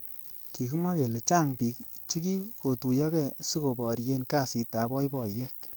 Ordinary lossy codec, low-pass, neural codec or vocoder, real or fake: none; none; codec, 44.1 kHz, 7.8 kbps, DAC; fake